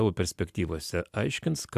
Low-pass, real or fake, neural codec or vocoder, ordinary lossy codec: 14.4 kHz; real; none; AAC, 96 kbps